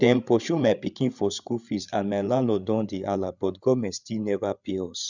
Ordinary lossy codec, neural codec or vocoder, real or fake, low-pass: none; codec, 16 kHz, 8 kbps, FreqCodec, larger model; fake; 7.2 kHz